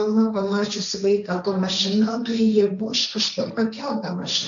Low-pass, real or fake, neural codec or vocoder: 7.2 kHz; fake; codec, 16 kHz, 1.1 kbps, Voila-Tokenizer